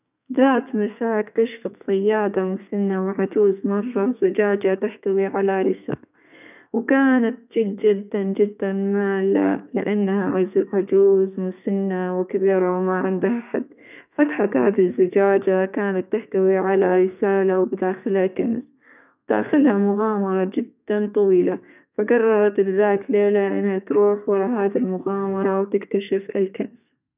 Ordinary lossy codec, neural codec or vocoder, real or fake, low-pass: none; codec, 32 kHz, 1.9 kbps, SNAC; fake; 3.6 kHz